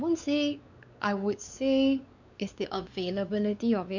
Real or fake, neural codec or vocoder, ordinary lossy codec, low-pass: fake; codec, 16 kHz, 4 kbps, X-Codec, WavLM features, trained on Multilingual LibriSpeech; none; 7.2 kHz